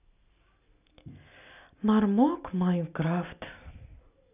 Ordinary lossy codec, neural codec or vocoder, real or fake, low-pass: none; none; real; 3.6 kHz